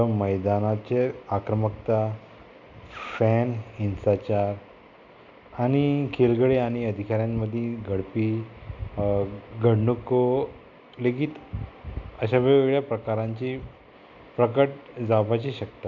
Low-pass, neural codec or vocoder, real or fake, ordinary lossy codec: 7.2 kHz; none; real; none